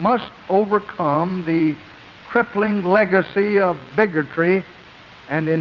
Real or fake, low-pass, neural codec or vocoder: fake; 7.2 kHz; vocoder, 22.05 kHz, 80 mel bands, WaveNeXt